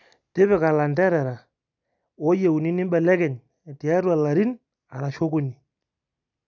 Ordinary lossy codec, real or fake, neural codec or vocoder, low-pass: none; real; none; 7.2 kHz